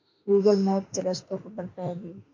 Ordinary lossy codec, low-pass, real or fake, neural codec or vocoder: MP3, 48 kbps; 7.2 kHz; fake; autoencoder, 48 kHz, 32 numbers a frame, DAC-VAE, trained on Japanese speech